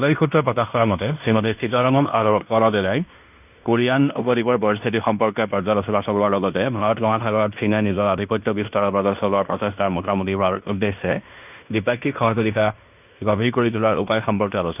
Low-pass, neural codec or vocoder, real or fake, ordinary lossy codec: 3.6 kHz; codec, 16 kHz in and 24 kHz out, 0.9 kbps, LongCat-Audio-Codec, fine tuned four codebook decoder; fake; none